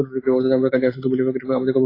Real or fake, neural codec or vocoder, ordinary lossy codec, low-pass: real; none; AAC, 24 kbps; 5.4 kHz